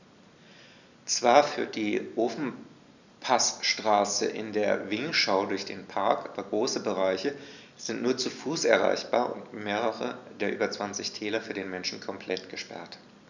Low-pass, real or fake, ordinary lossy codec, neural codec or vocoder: 7.2 kHz; real; none; none